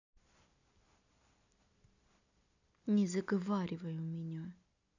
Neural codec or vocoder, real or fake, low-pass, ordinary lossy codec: none; real; 7.2 kHz; none